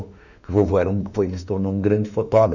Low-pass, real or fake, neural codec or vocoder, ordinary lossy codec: 7.2 kHz; fake; autoencoder, 48 kHz, 32 numbers a frame, DAC-VAE, trained on Japanese speech; none